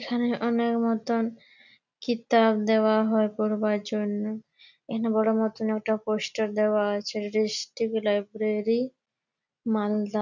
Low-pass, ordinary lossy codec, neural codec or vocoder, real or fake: 7.2 kHz; none; none; real